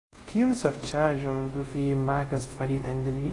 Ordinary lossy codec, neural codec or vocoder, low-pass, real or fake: AAC, 32 kbps; codec, 24 kHz, 0.5 kbps, DualCodec; 10.8 kHz; fake